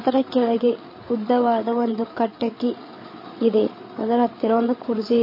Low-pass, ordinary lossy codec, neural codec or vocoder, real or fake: 5.4 kHz; MP3, 24 kbps; codec, 16 kHz, 8 kbps, FreqCodec, larger model; fake